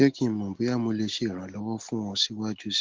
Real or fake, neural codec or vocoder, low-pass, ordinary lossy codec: real; none; 7.2 kHz; Opus, 16 kbps